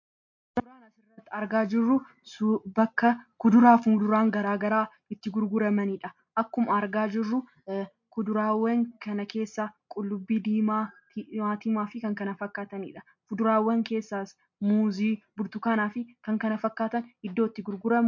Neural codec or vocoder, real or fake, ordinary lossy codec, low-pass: none; real; MP3, 48 kbps; 7.2 kHz